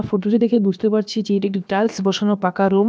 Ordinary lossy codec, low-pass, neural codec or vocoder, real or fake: none; none; codec, 16 kHz, about 1 kbps, DyCAST, with the encoder's durations; fake